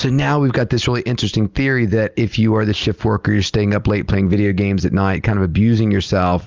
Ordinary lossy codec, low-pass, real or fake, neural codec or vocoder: Opus, 32 kbps; 7.2 kHz; real; none